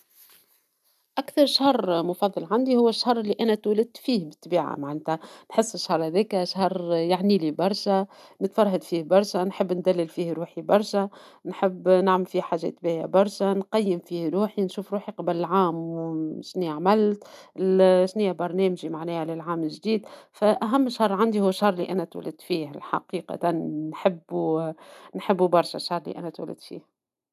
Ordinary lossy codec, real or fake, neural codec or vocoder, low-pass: none; real; none; 14.4 kHz